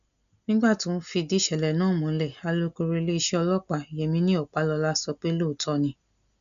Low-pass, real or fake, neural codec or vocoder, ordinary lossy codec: 7.2 kHz; real; none; none